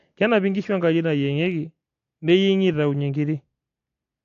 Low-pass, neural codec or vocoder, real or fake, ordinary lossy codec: 7.2 kHz; none; real; AAC, 48 kbps